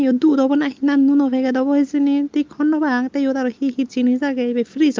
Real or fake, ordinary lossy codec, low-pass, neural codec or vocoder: real; Opus, 32 kbps; 7.2 kHz; none